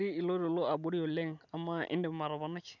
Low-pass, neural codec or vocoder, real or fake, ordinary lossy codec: 7.2 kHz; none; real; none